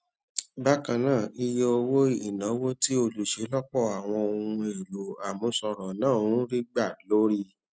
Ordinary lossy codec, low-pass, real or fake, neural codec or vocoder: none; none; real; none